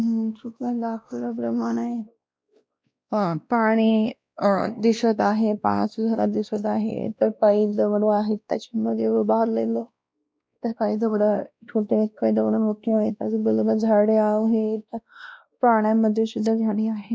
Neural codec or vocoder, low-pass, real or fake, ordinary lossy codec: codec, 16 kHz, 1 kbps, X-Codec, WavLM features, trained on Multilingual LibriSpeech; none; fake; none